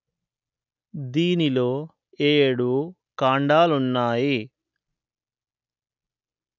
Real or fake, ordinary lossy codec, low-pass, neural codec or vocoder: real; none; 7.2 kHz; none